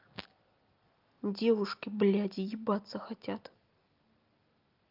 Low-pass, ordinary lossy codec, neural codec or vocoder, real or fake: 5.4 kHz; Opus, 24 kbps; none; real